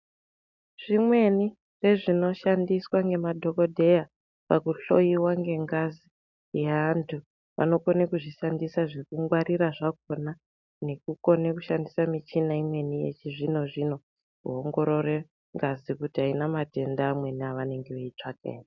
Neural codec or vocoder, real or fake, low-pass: none; real; 7.2 kHz